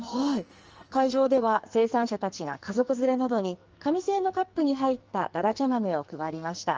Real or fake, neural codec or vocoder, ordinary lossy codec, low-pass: fake; codec, 44.1 kHz, 2.6 kbps, SNAC; Opus, 24 kbps; 7.2 kHz